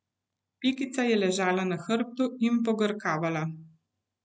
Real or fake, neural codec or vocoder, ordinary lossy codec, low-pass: real; none; none; none